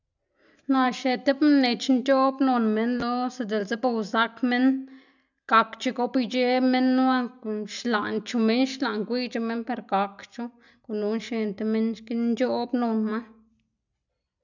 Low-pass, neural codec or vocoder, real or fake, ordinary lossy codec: 7.2 kHz; none; real; none